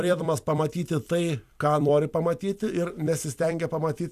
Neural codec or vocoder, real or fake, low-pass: vocoder, 44.1 kHz, 128 mel bands every 256 samples, BigVGAN v2; fake; 14.4 kHz